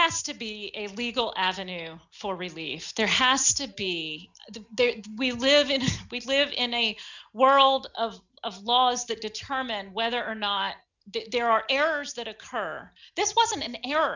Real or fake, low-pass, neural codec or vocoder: real; 7.2 kHz; none